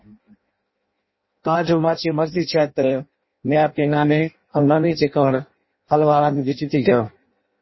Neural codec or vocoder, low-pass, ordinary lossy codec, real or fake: codec, 16 kHz in and 24 kHz out, 0.6 kbps, FireRedTTS-2 codec; 7.2 kHz; MP3, 24 kbps; fake